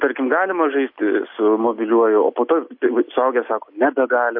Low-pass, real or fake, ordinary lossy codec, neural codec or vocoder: 5.4 kHz; real; MP3, 32 kbps; none